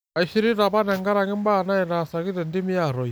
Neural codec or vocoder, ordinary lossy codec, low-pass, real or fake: none; none; none; real